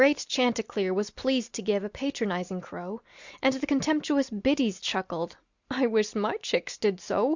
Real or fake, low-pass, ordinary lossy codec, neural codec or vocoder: real; 7.2 kHz; Opus, 64 kbps; none